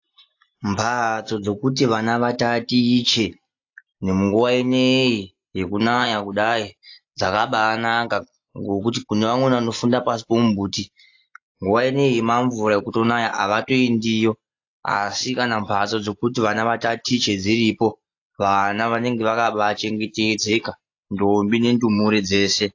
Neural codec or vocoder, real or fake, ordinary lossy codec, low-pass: none; real; AAC, 48 kbps; 7.2 kHz